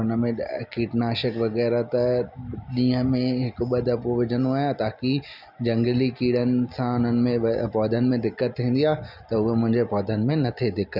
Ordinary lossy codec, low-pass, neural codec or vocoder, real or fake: none; 5.4 kHz; none; real